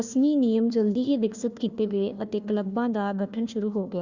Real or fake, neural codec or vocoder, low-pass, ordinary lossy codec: fake; codec, 16 kHz, 1 kbps, FunCodec, trained on Chinese and English, 50 frames a second; 7.2 kHz; Opus, 64 kbps